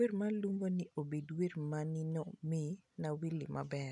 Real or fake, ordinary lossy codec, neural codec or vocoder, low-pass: real; none; none; 10.8 kHz